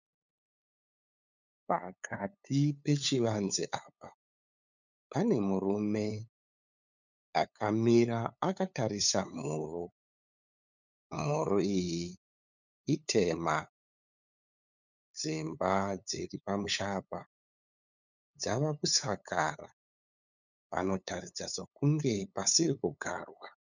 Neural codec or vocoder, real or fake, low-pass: codec, 16 kHz, 8 kbps, FunCodec, trained on LibriTTS, 25 frames a second; fake; 7.2 kHz